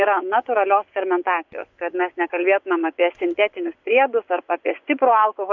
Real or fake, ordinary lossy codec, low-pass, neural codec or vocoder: fake; MP3, 64 kbps; 7.2 kHz; vocoder, 24 kHz, 100 mel bands, Vocos